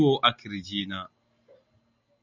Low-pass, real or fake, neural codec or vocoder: 7.2 kHz; real; none